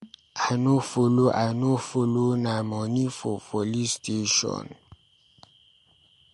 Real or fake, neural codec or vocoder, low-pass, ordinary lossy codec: real; none; 14.4 kHz; MP3, 48 kbps